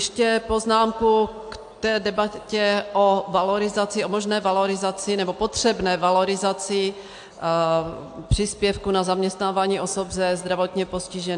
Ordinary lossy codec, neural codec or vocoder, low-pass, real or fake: AAC, 64 kbps; none; 9.9 kHz; real